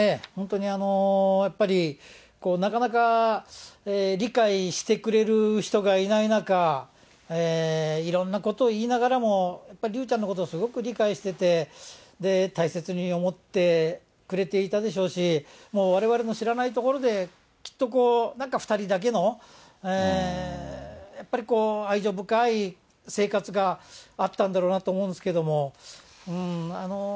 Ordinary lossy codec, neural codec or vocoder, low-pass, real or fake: none; none; none; real